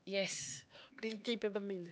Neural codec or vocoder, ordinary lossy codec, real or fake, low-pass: codec, 16 kHz, 2 kbps, X-Codec, HuBERT features, trained on LibriSpeech; none; fake; none